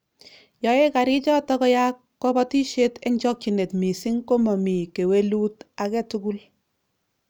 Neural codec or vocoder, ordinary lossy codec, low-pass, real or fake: none; none; none; real